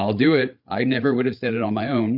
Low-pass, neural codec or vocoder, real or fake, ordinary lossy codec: 5.4 kHz; codec, 16 kHz, 8 kbps, FreqCodec, larger model; fake; MP3, 48 kbps